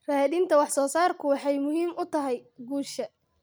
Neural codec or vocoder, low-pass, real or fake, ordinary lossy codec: none; none; real; none